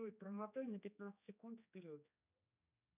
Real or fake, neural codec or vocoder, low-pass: fake; codec, 16 kHz, 2 kbps, X-Codec, HuBERT features, trained on general audio; 3.6 kHz